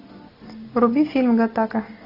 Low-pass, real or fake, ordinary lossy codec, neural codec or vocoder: 5.4 kHz; real; AAC, 32 kbps; none